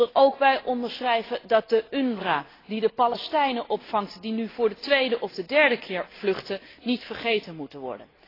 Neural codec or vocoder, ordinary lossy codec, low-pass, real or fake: none; AAC, 24 kbps; 5.4 kHz; real